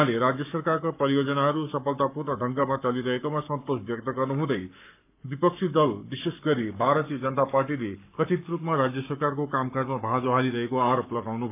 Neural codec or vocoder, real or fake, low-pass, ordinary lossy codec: codec, 44.1 kHz, 7.8 kbps, Pupu-Codec; fake; 3.6 kHz; AAC, 32 kbps